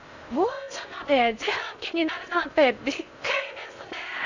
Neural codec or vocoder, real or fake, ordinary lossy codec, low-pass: codec, 16 kHz in and 24 kHz out, 0.6 kbps, FocalCodec, streaming, 4096 codes; fake; none; 7.2 kHz